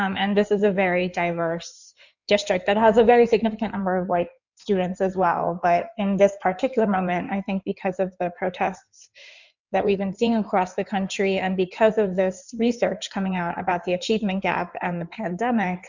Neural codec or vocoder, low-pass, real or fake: codec, 16 kHz in and 24 kHz out, 2.2 kbps, FireRedTTS-2 codec; 7.2 kHz; fake